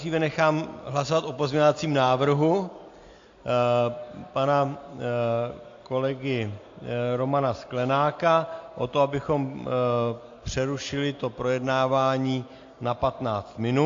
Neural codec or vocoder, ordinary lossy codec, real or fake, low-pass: none; AAC, 48 kbps; real; 7.2 kHz